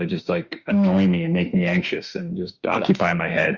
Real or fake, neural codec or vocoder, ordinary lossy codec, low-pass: fake; autoencoder, 48 kHz, 32 numbers a frame, DAC-VAE, trained on Japanese speech; Opus, 64 kbps; 7.2 kHz